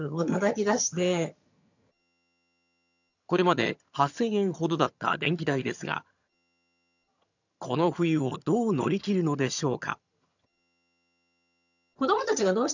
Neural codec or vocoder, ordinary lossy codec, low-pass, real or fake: vocoder, 22.05 kHz, 80 mel bands, HiFi-GAN; none; 7.2 kHz; fake